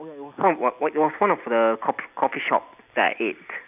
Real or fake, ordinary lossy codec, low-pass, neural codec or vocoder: real; none; 3.6 kHz; none